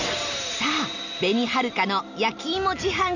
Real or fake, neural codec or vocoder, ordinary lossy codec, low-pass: real; none; none; 7.2 kHz